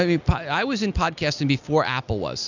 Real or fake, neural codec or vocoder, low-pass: real; none; 7.2 kHz